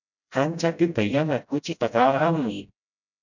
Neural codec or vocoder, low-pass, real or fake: codec, 16 kHz, 0.5 kbps, FreqCodec, smaller model; 7.2 kHz; fake